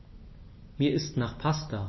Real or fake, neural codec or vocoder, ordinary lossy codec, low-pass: real; none; MP3, 24 kbps; 7.2 kHz